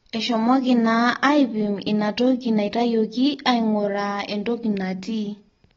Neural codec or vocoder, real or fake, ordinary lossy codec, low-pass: none; real; AAC, 24 kbps; 7.2 kHz